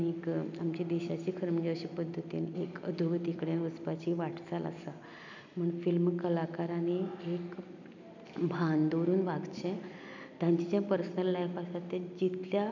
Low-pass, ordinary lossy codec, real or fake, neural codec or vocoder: 7.2 kHz; none; real; none